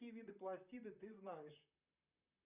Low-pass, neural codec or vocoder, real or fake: 3.6 kHz; vocoder, 44.1 kHz, 128 mel bands, Pupu-Vocoder; fake